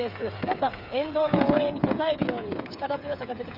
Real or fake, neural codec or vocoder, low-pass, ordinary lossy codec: fake; codec, 16 kHz, 8 kbps, FreqCodec, smaller model; 5.4 kHz; none